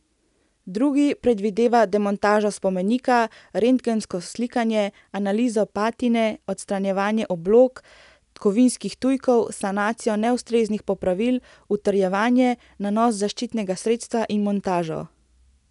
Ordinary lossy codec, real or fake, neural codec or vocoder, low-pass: none; real; none; 10.8 kHz